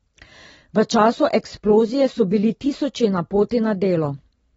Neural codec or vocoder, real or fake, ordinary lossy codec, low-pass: none; real; AAC, 24 kbps; 10.8 kHz